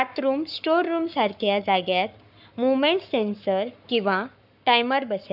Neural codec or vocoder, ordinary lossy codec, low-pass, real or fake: codec, 44.1 kHz, 7.8 kbps, Pupu-Codec; none; 5.4 kHz; fake